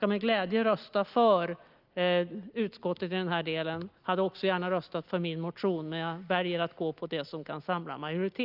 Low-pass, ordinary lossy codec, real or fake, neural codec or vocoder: 5.4 kHz; Opus, 24 kbps; real; none